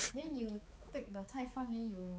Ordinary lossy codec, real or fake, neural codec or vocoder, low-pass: none; fake; codec, 16 kHz, 4 kbps, X-Codec, HuBERT features, trained on balanced general audio; none